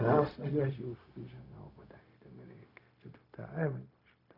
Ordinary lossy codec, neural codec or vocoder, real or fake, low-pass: AAC, 48 kbps; codec, 16 kHz, 0.4 kbps, LongCat-Audio-Codec; fake; 5.4 kHz